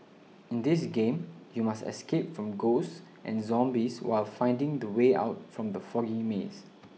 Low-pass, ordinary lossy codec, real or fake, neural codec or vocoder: none; none; real; none